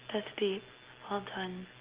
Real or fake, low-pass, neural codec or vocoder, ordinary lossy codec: real; 3.6 kHz; none; Opus, 16 kbps